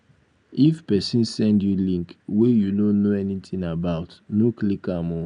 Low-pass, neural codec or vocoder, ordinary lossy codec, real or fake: 9.9 kHz; none; none; real